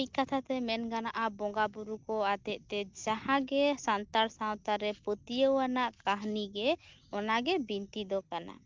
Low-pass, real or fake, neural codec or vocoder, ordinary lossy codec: 7.2 kHz; real; none; Opus, 32 kbps